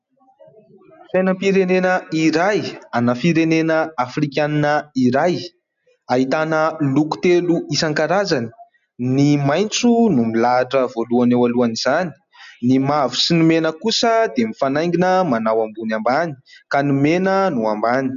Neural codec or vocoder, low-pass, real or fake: none; 7.2 kHz; real